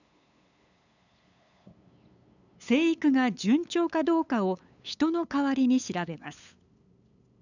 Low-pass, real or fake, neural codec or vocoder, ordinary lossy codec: 7.2 kHz; fake; codec, 16 kHz, 8 kbps, FunCodec, trained on LibriTTS, 25 frames a second; none